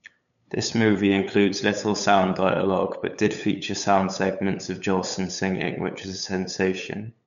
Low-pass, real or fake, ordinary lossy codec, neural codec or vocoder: 7.2 kHz; fake; none; codec, 16 kHz, 8 kbps, FunCodec, trained on LibriTTS, 25 frames a second